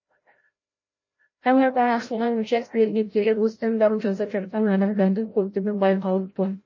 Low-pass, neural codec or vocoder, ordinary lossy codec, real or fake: 7.2 kHz; codec, 16 kHz, 0.5 kbps, FreqCodec, larger model; MP3, 32 kbps; fake